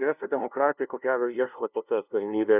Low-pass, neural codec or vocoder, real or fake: 3.6 kHz; codec, 16 kHz, 0.5 kbps, FunCodec, trained on LibriTTS, 25 frames a second; fake